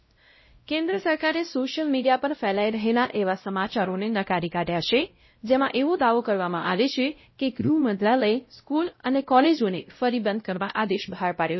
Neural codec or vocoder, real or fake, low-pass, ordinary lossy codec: codec, 16 kHz, 0.5 kbps, X-Codec, WavLM features, trained on Multilingual LibriSpeech; fake; 7.2 kHz; MP3, 24 kbps